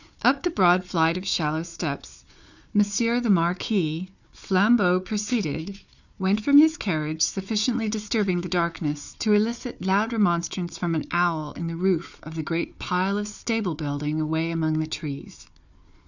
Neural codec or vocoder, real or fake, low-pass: codec, 16 kHz, 4 kbps, FunCodec, trained on Chinese and English, 50 frames a second; fake; 7.2 kHz